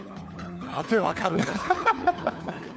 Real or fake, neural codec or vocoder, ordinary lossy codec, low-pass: fake; codec, 16 kHz, 4 kbps, FunCodec, trained on LibriTTS, 50 frames a second; none; none